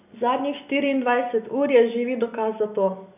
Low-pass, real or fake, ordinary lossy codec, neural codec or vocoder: 3.6 kHz; real; none; none